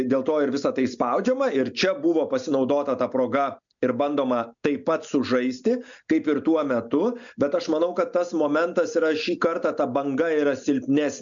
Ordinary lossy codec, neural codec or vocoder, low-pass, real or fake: MP3, 64 kbps; none; 7.2 kHz; real